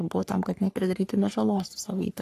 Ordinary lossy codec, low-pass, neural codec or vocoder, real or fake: AAC, 48 kbps; 14.4 kHz; codec, 44.1 kHz, 3.4 kbps, Pupu-Codec; fake